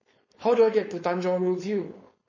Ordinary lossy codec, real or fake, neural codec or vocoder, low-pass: MP3, 32 kbps; fake; codec, 16 kHz, 4.8 kbps, FACodec; 7.2 kHz